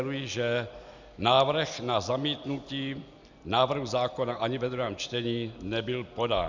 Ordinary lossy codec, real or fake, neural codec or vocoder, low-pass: Opus, 64 kbps; real; none; 7.2 kHz